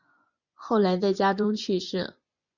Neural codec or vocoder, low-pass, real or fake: vocoder, 24 kHz, 100 mel bands, Vocos; 7.2 kHz; fake